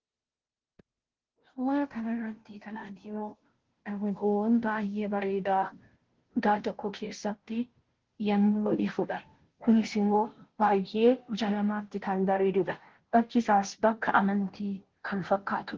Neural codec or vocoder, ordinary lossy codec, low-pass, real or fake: codec, 16 kHz, 0.5 kbps, FunCodec, trained on Chinese and English, 25 frames a second; Opus, 16 kbps; 7.2 kHz; fake